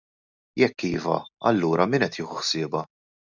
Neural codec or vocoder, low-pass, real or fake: none; 7.2 kHz; real